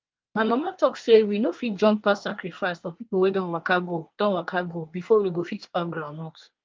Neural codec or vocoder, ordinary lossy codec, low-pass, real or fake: codec, 24 kHz, 1 kbps, SNAC; Opus, 24 kbps; 7.2 kHz; fake